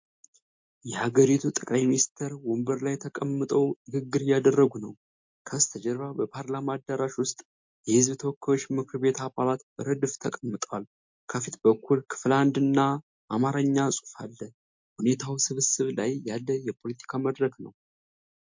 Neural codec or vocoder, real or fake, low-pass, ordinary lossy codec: none; real; 7.2 kHz; MP3, 48 kbps